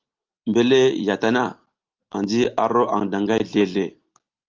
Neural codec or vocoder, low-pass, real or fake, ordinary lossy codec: none; 7.2 kHz; real; Opus, 32 kbps